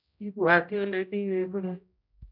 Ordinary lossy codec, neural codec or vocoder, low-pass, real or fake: none; codec, 16 kHz, 0.5 kbps, X-Codec, HuBERT features, trained on general audio; 5.4 kHz; fake